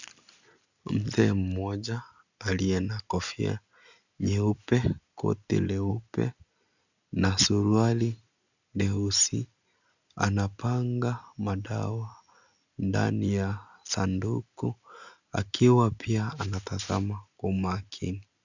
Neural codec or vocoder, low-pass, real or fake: none; 7.2 kHz; real